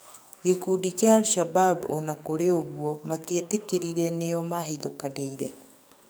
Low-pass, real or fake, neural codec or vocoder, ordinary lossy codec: none; fake; codec, 44.1 kHz, 2.6 kbps, SNAC; none